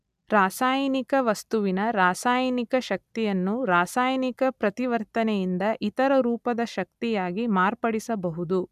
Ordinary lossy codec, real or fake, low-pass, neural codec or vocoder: none; real; 14.4 kHz; none